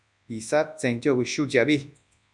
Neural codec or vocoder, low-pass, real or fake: codec, 24 kHz, 0.9 kbps, WavTokenizer, large speech release; 10.8 kHz; fake